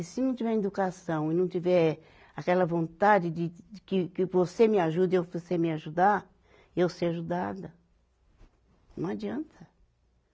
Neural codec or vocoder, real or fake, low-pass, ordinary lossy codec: none; real; none; none